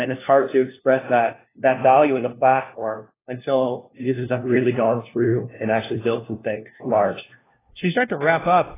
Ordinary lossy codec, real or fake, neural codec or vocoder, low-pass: AAC, 16 kbps; fake; codec, 16 kHz, 1 kbps, FunCodec, trained on LibriTTS, 50 frames a second; 3.6 kHz